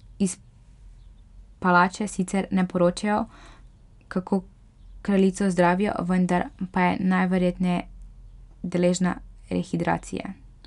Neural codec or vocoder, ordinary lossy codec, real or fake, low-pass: none; none; real; 10.8 kHz